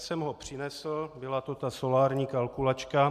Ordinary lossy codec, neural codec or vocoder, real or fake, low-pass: MP3, 96 kbps; none; real; 14.4 kHz